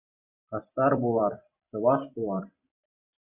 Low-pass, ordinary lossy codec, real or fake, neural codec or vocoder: 3.6 kHz; Opus, 64 kbps; fake; vocoder, 44.1 kHz, 128 mel bands every 256 samples, BigVGAN v2